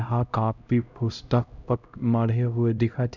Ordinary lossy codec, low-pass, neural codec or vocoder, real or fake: none; 7.2 kHz; codec, 16 kHz, 0.5 kbps, X-Codec, HuBERT features, trained on LibriSpeech; fake